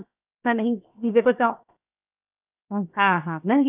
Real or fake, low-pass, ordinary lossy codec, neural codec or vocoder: fake; 3.6 kHz; none; codec, 16 kHz, 0.7 kbps, FocalCodec